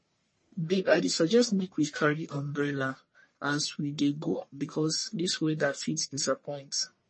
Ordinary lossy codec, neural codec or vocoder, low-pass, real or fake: MP3, 32 kbps; codec, 44.1 kHz, 1.7 kbps, Pupu-Codec; 9.9 kHz; fake